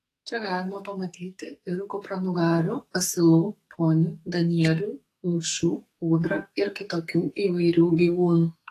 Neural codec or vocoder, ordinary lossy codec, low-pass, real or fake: codec, 44.1 kHz, 2.6 kbps, SNAC; AAC, 48 kbps; 14.4 kHz; fake